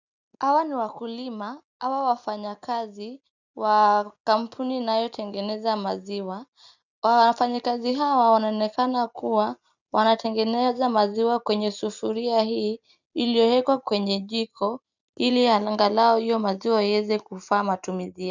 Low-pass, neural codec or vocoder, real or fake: 7.2 kHz; none; real